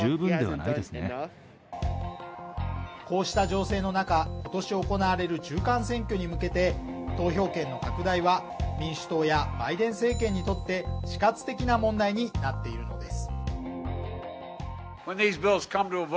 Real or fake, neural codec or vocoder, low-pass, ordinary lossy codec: real; none; none; none